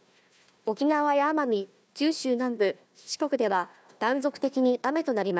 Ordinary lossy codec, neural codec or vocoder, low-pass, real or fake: none; codec, 16 kHz, 1 kbps, FunCodec, trained on Chinese and English, 50 frames a second; none; fake